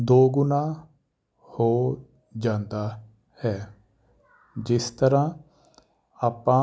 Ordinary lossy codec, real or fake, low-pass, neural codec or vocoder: none; real; none; none